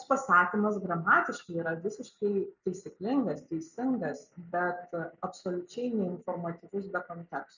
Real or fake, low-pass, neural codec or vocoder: real; 7.2 kHz; none